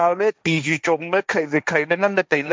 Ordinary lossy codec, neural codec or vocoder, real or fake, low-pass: none; codec, 16 kHz, 1.1 kbps, Voila-Tokenizer; fake; none